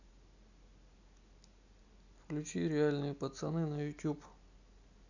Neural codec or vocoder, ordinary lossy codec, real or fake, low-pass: none; none; real; 7.2 kHz